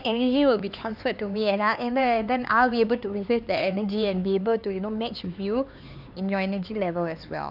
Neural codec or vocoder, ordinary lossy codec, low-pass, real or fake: codec, 16 kHz, 4 kbps, X-Codec, HuBERT features, trained on LibriSpeech; none; 5.4 kHz; fake